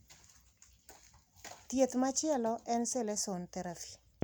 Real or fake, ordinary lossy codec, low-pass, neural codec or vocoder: real; none; none; none